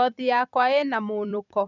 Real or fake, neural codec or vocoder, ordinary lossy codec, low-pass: fake; vocoder, 44.1 kHz, 128 mel bands every 256 samples, BigVGAN v2; none; 7.2 kHz